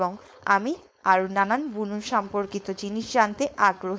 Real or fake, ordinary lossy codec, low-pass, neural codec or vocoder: fake; none; none; codec, 16 kHz, 4.8 kbps, FACodec